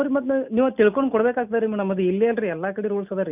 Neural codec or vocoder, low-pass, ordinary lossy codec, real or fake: none; 3.6 kHz; none; real